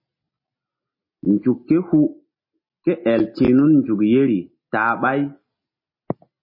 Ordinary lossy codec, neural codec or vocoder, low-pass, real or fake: MP3, 24 kbps; none; 5.4 kHz; real